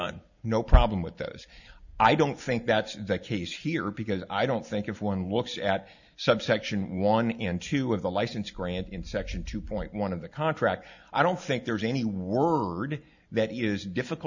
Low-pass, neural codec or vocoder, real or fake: 7.2 kHz; none; real